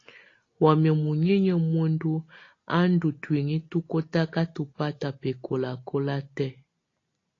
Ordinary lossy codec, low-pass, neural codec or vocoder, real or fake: AAC, 32 kbps; 7.2 kHz; none; real